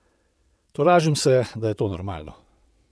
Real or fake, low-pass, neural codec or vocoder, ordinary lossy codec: fake; none; vocoder, 22.05 kHz, 80 mel bands, WaveNeXt; none